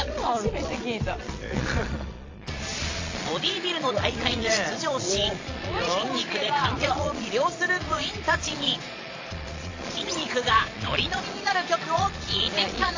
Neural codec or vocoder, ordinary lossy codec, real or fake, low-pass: vocoder, 22.05 kHz, 80 mel bands, Vocos; AAC, 32 kbps; fake; 7.2 kHz